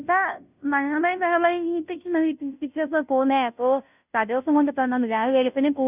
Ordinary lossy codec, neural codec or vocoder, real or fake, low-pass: none; codec, 16 kHz, 0.5 kbps, FunCodec, trained on Chinese and English, 25 frames a second; fake; 3.6 kHz